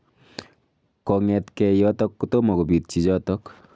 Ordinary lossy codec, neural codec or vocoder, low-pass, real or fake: none; none; none; real